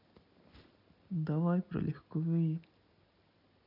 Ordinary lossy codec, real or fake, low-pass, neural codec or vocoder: none; real; 5.4 kHz; none